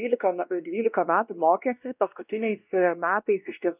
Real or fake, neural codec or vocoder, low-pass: fake; codec, 16 kHz, 1 kbps, X-Codec, WavLM features, trained on Multilingual LibriSpeech; 3.6 kHz